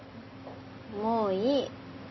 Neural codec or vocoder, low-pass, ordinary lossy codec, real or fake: none; 7.2 kHz; MP3, 24 kbps; real